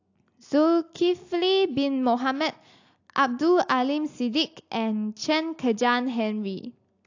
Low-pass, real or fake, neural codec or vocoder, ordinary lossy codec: 7.2 kHz; real; none; AAC, 48 kbps